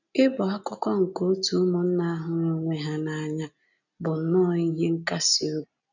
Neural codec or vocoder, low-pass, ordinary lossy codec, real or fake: none; 7.2 kHz; none; real